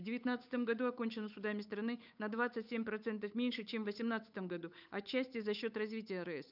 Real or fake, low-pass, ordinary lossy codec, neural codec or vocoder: fake; 5.4 kHz; none; codec, 16 kHz, 8 kbps, FunCodec, trained on Chinese and English, 25 frames a second